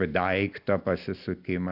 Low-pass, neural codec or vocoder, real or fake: 5.4 kHz; none; real